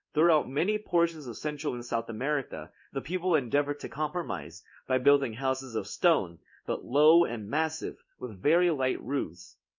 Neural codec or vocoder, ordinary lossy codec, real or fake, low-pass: codec, 16 kHz in and 24 kHz out, 1 kbps, XY-Tokenizer; MP3, 64 kbps; fake; 7.2 kHz